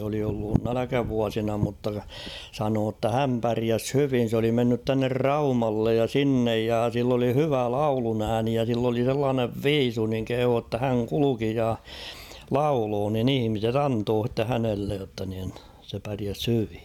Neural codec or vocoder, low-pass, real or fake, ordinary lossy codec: none; 19.8 kHz; real; none